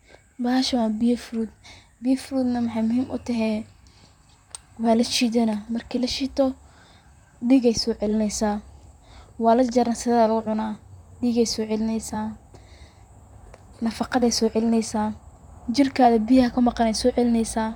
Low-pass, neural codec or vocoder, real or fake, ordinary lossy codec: 19.8 kHz; vocoder, 44.1 kHz, 128 mel bands, Pupu-Vocoder; fake; none